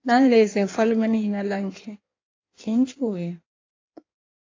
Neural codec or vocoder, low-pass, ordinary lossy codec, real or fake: codec, 16 kHz in and 24 kHz out, 1.1 kbps, FireRedTTS-2 codec; 7.2 kHz; AAC, 32 kbps; fake